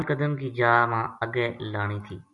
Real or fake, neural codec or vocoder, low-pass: real; none; 10.8 kHz